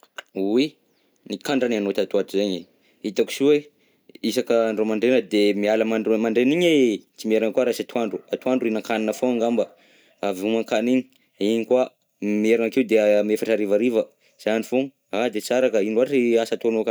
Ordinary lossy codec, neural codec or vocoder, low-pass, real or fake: none; none; none; real